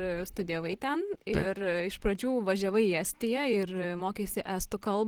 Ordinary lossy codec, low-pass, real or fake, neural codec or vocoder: Opus, 16 kbps; 19.8 kHz; fake; vocoder, 44.1 kHz, 128 mel bands, Pupu-Vocoder